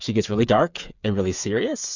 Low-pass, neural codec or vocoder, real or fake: 7.2 kHz; codec, 16 kHz, 8 kbps, FreqCodec, smaller model; fake